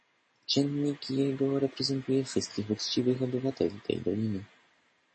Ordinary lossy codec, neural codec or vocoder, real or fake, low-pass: MP3, 32 kbps; none; real; 9.9 kHz